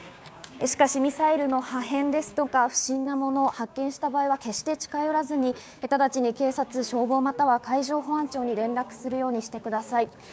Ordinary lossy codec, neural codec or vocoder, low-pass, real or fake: none; codec, 16 kHz, 6 kbps, DAC; none; fake